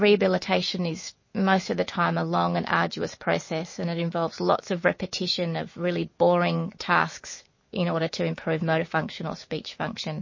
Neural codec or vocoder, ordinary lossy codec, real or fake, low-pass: autoencoder, 48 kHz, 128 numbers a frame, DAC-VAE, trained on Japanese speech; MP3, 32 kbps; fake; 7.2 kHz